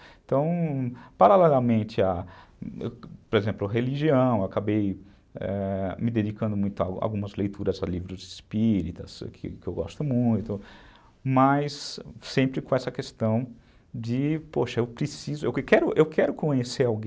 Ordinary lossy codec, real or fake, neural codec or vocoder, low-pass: none; real; none; none